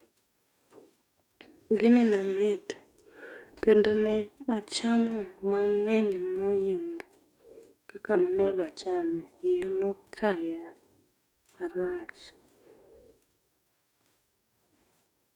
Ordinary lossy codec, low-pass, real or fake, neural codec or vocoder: none; 19.8 kHz; fake; codec, 44.1 kHz, 2.6 kbps, DAC